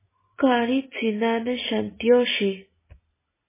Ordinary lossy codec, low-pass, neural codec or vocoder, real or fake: MP3, 16 kbps; 3.6 kHz; none; real